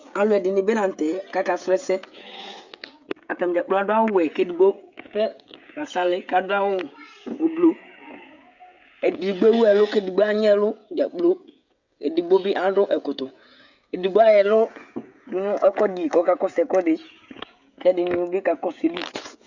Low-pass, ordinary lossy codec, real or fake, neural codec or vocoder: 7.2 kHz; Opus, 64 kbps; fake; codec, 16 kHz, 16 kbps, FreqCodec, smaller model